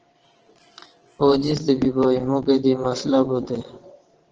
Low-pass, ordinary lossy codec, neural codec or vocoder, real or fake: 7.2 kHz; Opus, 16 kbps; vocoder, 22.05 kHz, 80 mel bands, WaveNeXt; fake